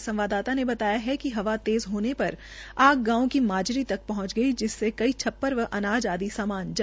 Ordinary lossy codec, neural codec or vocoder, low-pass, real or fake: none; none; none; real